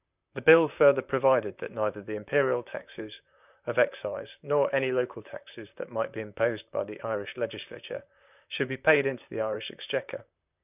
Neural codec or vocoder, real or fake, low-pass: vocoder, 44.1 kHz, 128 mel bands, Pupu-Vocoder; fake; 3.6 kHz